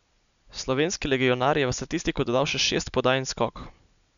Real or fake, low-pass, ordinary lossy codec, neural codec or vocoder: real; 7.2 kHz; none; none